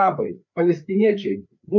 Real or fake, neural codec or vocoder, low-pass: fake; codec, 16 kHz, 4 kbps, FreqCodec, larger model; 7.2 kHz